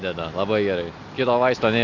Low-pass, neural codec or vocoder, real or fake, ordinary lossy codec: 7.2 kHz; none; real; Opus, 64 kbps